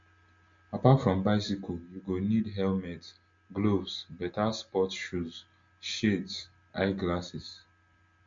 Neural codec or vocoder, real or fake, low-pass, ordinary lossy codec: none; real; 7.2 kHz; MP3, 48 kbps